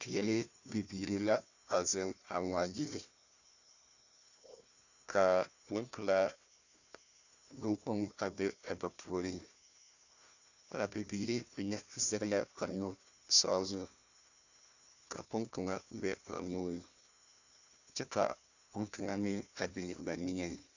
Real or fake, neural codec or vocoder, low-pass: fake; codec, 16 kHz, 1 kbps, FunCodec, trained on Chinese and English, 50 frames a second; 7.2 kHz